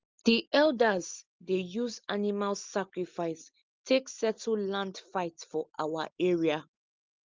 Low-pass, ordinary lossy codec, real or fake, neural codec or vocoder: 7.2 kHz; Opus, 32 kbps; real; none